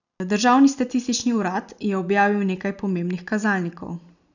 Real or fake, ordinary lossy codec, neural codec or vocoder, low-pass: real; none; none; 7.2 kHz